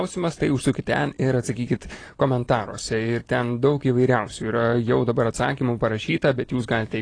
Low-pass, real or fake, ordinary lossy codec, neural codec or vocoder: 9.9 kHz; real; AAC, 32 kbps; none